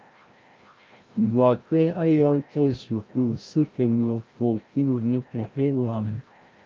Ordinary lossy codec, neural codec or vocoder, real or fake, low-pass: Opus, 24 kbps; codec, 16 kHz, 0.5 kbps, FreqCodec, larger model; fake; 7.2 kHz